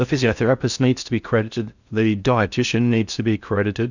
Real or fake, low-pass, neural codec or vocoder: fake; 7.2 kHz; codec, 16 kHz in and 24 kHz out, 0.6 kbps, FocalCodec, streaming, 4096 codes